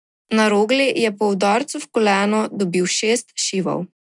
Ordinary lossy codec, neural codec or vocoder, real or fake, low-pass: none; none; real; 10.8 kHz